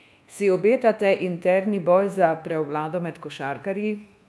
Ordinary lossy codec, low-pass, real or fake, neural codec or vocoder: none; none; fake; codec, 24 kHz, 1.2 kbps, DualCodec